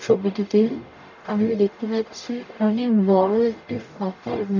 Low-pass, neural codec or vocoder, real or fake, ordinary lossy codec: 7.2 kHz; codec, 44.1 kHz, 0.9 kbps, DAC; fake; none